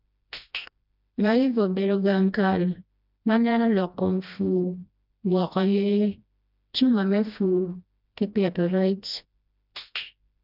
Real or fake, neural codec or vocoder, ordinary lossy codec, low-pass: fake; codec, 16 kHz, 1 kbps, FreqCodec, smaller model; none; 5.4 kHz